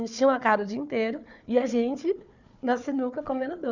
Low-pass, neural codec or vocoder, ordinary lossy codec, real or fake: 7.2 kHz; codec, 16 kHz, 4 kbps, FunCodec, trained on Chinese and English, 50 frames a second; none; fake